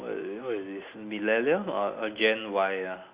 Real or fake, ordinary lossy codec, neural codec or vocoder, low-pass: real; Opus, 64 kbps; none; 3.6 kHz